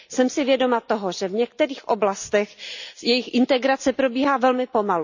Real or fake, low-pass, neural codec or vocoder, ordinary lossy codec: real; 7.2 kHz; none; none